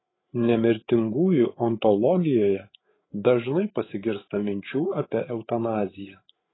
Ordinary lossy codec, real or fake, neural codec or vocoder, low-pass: AAC, 16 kbps; fake; codec, 16 kHz, 8 kbps, FreqCodec, larger model; 7.2 kHz